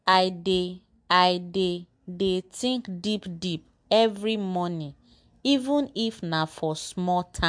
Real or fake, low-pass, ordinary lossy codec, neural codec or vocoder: real; 9.9 kHz; MP3, 64 kbps; none